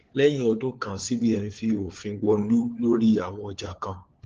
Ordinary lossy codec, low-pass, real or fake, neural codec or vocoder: Opus, 16 kbps; 7.2 kHz; fake; codec, 16 kHz, 4 kbps, FunCodec, trained on LibriTTS, 50 frames a second